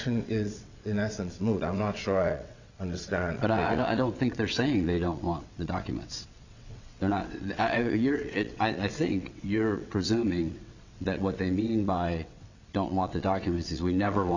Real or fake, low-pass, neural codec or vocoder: fake; 7.2 kHz; vocoder, 22.05 kHz, 80 mel bands, WaveNeXt